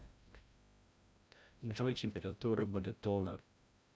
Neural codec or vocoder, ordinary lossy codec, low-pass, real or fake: codec, 16 kHz, 0.5 kbps, FreqCodec, larger model; none; none; fake